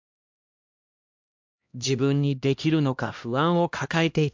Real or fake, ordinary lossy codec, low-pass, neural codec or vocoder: fake; MP3, 64 kbps; 7.2 kHz; codec, 16 kHz, 1 kbps, X-Codec, HuBERT features, trained on LibriSpeech